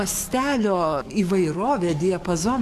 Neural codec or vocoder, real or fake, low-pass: codec, 44.1 kHz, 7.8 kbps, DAC; fake; 14.4 kHz